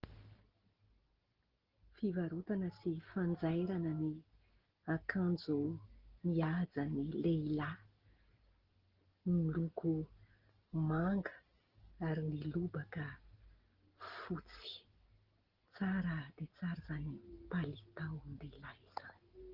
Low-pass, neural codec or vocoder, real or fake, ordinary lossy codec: 5.4 kHz; none; real; Opus, 16 kbps